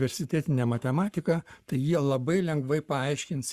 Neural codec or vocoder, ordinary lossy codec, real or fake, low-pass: codec, 44.1 kHz, 7.8 kbps, Pupu-Codec; Opus, 64 kbps; fake; 14.4 kHz